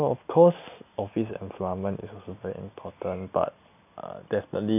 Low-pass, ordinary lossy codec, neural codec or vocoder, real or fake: 3.6 kHz; none; none; real